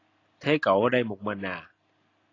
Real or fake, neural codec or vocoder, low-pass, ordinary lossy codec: real; none; 7.2 kHz; AAC, 32 kbps